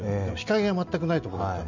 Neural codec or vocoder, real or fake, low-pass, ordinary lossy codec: none; real; 7.2 kHz; none